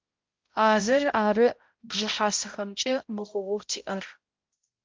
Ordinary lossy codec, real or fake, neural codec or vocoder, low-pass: Opus, 24 kbps; fake; codec, 16 kHz, 0.5 kbps, X-Codec, HuBERT features, trained on balanced general audio; 7.2 kHz